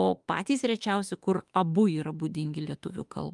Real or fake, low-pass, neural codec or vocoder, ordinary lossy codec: fake; 10.8 kHz; autoencoder, 48 kHz, 32 numbers a frame, DAC-VAE, trained on Japanese speech; Opus, 32 kbps